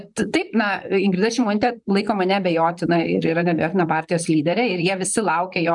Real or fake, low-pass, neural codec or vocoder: fake; 10.8 kHz; vocoder, 44.1 kHz, 128 mel bands, Pupu-Vocoder